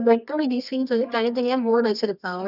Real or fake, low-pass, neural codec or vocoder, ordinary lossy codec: fake; 5.4 kHz; codec, 24 kHz, 0.9 kbps, WavTokenizer, medium music audio release; none